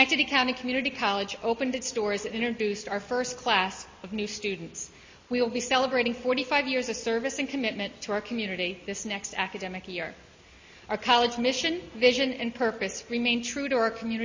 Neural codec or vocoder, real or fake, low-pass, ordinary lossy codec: none; real; 7.2 kHz; MP3, 32 kbps